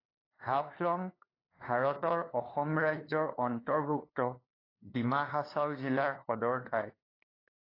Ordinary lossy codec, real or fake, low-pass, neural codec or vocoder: AAC, 24 kbps; fake; 5.4 kHz; codec, 16 kHz, 2 kbps, FunCodec, trained on LibriTTS, 25 frames a second